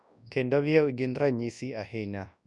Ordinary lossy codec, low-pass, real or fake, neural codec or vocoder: none; 10.8 kHz; fake; codec, 24 kHz, 0.9 kbps, WavTokenizer, large speech release